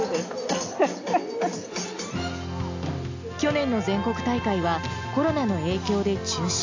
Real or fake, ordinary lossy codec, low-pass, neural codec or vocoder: real; AAC, 48 kbps; 7.2 kHz; none